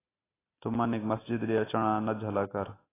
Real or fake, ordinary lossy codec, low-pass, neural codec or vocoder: real; AAC, 16 kbps; 3.6 kHz; none